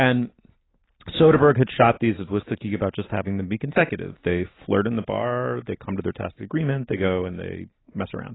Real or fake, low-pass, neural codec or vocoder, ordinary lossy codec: real; 7.2 kHz; none; AAC, 16 kbps